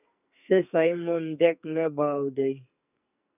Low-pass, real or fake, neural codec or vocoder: 3.6 kHz; fake; codec, 44.1 kHz, 2.6 kbps, SNAC